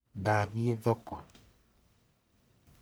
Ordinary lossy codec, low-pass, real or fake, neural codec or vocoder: none; none; fake; codec, 44.1 kHz, 1.7 kbps, Pupu-Codec